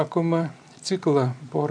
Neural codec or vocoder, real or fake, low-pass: none; real; 9.9 kHz